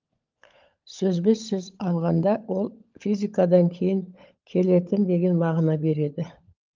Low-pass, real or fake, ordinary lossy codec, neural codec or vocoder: 7.2 kHz; fake; Opus, 24 kbps; codec, 16 kHz, 16 kbps, FunCodec, trained on LibriTTS, 50 frames a second